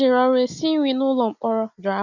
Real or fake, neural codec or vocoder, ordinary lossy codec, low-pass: real; none; none; 7.2 kHz